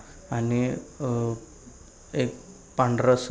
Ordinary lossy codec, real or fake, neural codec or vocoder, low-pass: none; real; none; none